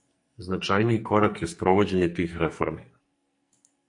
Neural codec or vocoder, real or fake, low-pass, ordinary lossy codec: codec, 44.1 kHz, 2.6 kbps, SNAC; fake; 10.8 kHz; MP3, 48 kbps